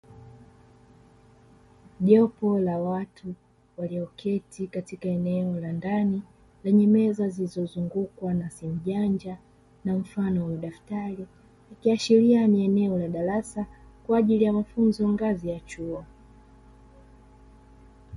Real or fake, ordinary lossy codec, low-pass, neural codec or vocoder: real; MP3, 48 kbps; 19.8 kHz; none